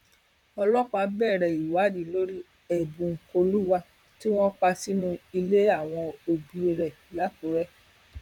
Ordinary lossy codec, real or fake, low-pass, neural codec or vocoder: none; fake; 19.8 kHz; vocoder, 44.1 kHz, 128 mel bands, Pupu-Vocoder